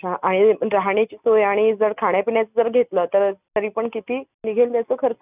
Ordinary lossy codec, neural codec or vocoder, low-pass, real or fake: none; none; 3.6 kHz; real